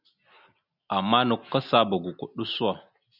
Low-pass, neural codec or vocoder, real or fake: 5.4 kHz; none; real